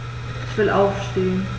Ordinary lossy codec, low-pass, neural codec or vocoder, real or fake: none; none; none; real